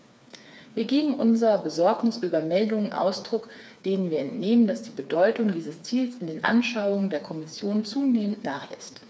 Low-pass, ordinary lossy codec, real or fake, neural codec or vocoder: none; none; fake; codec, 16 kHz, 4 kbps, FreqCodec, smaller model